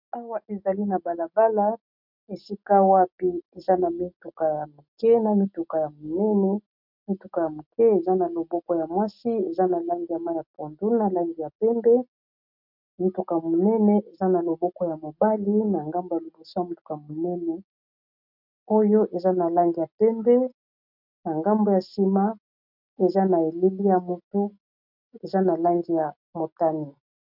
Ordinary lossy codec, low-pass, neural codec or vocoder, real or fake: MP3, 48 kbps; 5.4 kHz; none; real